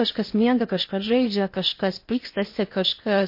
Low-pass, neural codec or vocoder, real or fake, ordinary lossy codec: 5.4 kHz; codec, 16 kHz in and 24 kHz out, 0.8 kbps, FocalCodec, streaming, 65536 codes; fake; MP3, 32 kbps